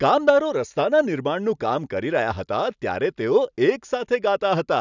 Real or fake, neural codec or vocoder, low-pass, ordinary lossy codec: real; none; 7.2 kHz; none